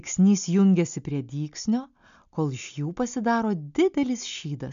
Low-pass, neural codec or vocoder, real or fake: 7.2 kHz; none; real